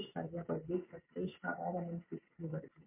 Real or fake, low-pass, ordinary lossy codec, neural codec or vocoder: real; 3.6 kHz; MP3, 24 kbps; none